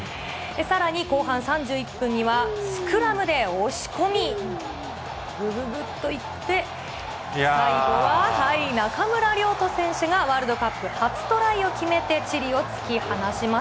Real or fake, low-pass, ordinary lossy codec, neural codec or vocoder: real; none; none; none